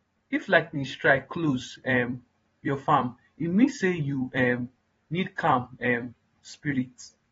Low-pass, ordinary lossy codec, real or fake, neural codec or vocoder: 19.8 kHz; AAC, 24 kbps; fake; vocoder, 44.1 kHz, 128 mel bands every 256 samples, BigVGAN v2